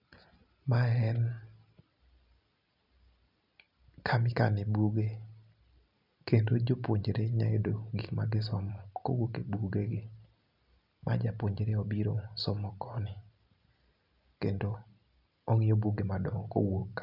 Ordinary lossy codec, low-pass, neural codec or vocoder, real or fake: none; 5.4 kHz; none; real